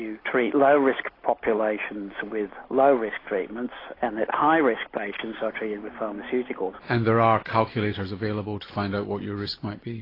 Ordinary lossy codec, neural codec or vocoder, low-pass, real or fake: AAC, 24 kbps; none; 5.4 kHz; real